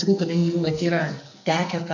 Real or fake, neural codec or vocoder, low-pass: fake; codec, 44.1 kHz, 2.6 kbps, SNAC; 7.2 kHz